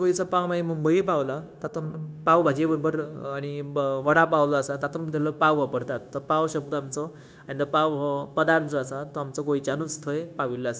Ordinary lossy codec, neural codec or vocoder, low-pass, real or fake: none; codec, 16 kHz, 0.9 kbps, LongCat-Audio-Codec; none; fake